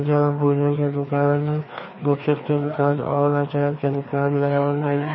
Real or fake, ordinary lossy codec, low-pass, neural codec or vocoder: fake; MP3, 24 kbps; 7.2 kHz; codec, 16 kHz, 2 kbps, FreqCodec, larger model